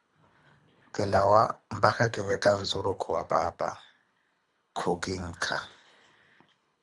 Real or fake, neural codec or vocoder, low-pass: fake; codec, 24 kHz, 3 kbps, HILCodec; 10.8 kHz